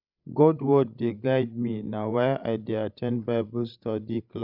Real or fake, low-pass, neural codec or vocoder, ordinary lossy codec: fake; 5.4 kHz; codec, 16 kHz, 16 kbps, FreqCodec, larger model; none